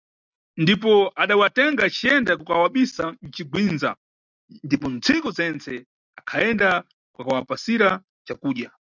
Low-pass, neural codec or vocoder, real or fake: 7.2 kHz; none; real